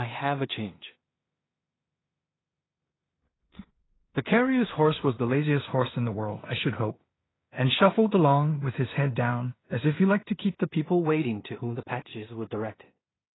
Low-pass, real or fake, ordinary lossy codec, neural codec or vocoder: 7.2 kHz; fake; AAC, 16 kbps; codec, 16 kHz in and 24 kHz out, 0.4 kbps, LongCat-Audio-Codec, two codebook decoder